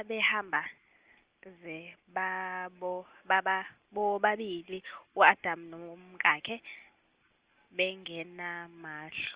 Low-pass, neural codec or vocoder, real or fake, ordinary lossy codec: 3.6 kHz; none; real; Opus, 24 kbps